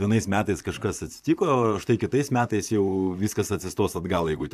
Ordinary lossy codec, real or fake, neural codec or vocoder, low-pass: AAC, 96 kbps; fake; vocoder, 44.1 kHz, 128 mel bands, Pupu-Vocoder; 14.4 kHz